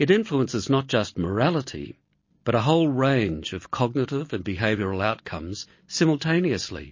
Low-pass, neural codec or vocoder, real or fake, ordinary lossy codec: 7.2 kHz; none; real; MP3, 32 kbps